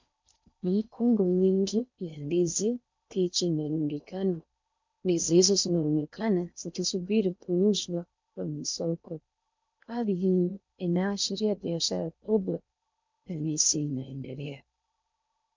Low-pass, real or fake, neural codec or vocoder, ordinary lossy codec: 7.2 kHz; fake; codec, 16 kHz in and 24 kHz out, 0.6 kbps, FocalCodec, streaming, 4096 codes; MP3, 64 kbps